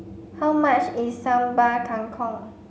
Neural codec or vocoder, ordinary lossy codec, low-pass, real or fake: none; none; none; real